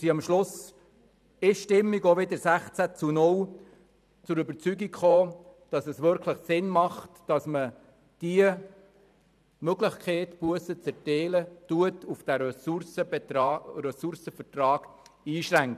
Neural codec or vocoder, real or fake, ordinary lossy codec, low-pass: vocoder, 44.1 kHz, 128 mel bands every 512 samples, BigVGAN v2; fake; none; 14.4 kHz